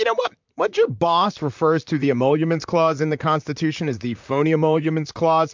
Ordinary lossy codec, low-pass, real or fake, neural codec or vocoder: MP3, 64 kbps; 7.2 kHz; fake; vocoder, 44.1 kHz, 128 mel bands, Pupu-Vocoder